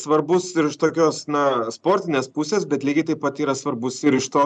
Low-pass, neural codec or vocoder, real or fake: 9.9 kHz; none; real